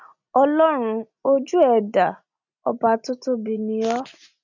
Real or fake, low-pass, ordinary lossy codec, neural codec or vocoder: real; 7.2 kHz; none; none